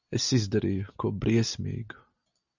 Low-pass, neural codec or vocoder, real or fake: 7.2 kHz; none; real